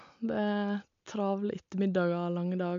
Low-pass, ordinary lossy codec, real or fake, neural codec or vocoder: 7.2 kHz; MP3, 64 kbps; real; none